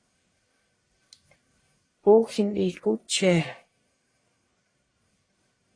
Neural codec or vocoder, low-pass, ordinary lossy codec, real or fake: codec, 44.1 kHz, 1.7 kbps, Pupu-Codec; 9.9 kHz; AAC, 32 kbps; fake